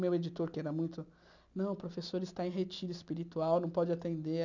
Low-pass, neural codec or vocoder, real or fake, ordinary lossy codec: 7.2 kHz; none; real; none